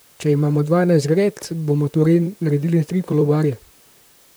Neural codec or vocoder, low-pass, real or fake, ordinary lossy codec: vocoder, 44.1 kHz, 128 mel bands, Pupu-Vocoder; none; fake; none